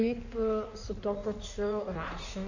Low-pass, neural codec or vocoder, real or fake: 7.2 kHz; codec, 16 kHz in and 24 kHz out, 1.1 kbps, FireRedTTS-2 codec; fake